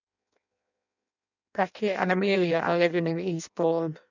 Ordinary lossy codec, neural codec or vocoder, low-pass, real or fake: none; codec, 16 kHz in and 24 kHz out, 0.6 kbps, FireRedTTS-2 codec; 7.2 kHz; fake